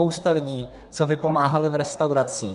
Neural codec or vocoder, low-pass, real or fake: codec, 24 kHz, 1 kbps, SNAC; 10.8 kHz; fake